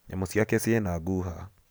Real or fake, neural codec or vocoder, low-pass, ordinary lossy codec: real; none; none; none